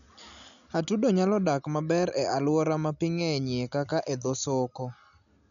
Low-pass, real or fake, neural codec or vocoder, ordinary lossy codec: 7.2 kHz; real; none; none